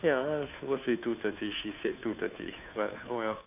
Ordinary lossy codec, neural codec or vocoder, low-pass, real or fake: none; codec, 16 kHz, 2 kbps, FunCodec, trained on Chinese and English, 25 frames a second; 3.6 kHz; fake